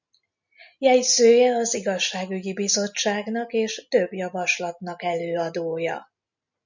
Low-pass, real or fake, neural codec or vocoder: 7.2 kHz; real; none